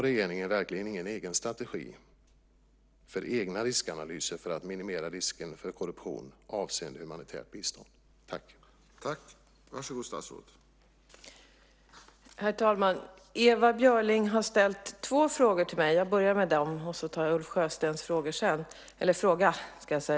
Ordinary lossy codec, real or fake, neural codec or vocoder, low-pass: none; real; none; none